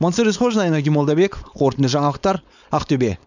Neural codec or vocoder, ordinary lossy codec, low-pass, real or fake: codec, 16 kHz, 4.8 kbps, FACodec; none; 7.2 kHz; fake